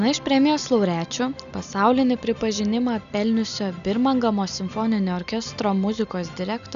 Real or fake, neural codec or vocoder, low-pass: real; none; 7.2 kHz